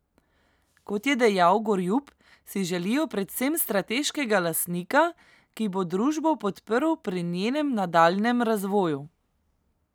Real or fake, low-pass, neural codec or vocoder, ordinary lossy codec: real; none; none; none